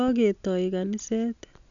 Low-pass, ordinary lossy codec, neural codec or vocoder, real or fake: 7.2 kHz; none; none; real